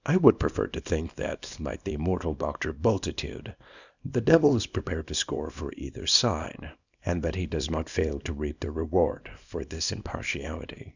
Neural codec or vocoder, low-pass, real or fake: codec, 24 kHz, 0.9 kbps, WavTokenizer, small release; 7.2 kHz; fake